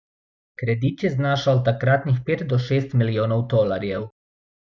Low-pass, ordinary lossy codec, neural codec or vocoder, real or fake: none; none; none; real